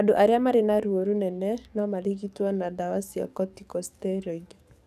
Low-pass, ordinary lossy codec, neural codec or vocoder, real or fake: 14.4 kHz; none; codec, 44.1 kHz, 7.8 kbps, DAC; fake